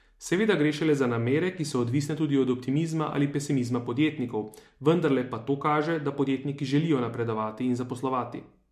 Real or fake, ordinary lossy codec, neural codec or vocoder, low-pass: real; MP3, 64 kbps; none; 14.4 kHz